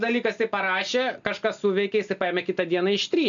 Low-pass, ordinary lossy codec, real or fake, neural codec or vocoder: 7.2 kHz; MP3, 64 kbps; real; none